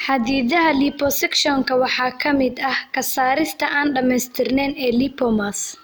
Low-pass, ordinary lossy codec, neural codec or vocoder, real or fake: none; none; none; real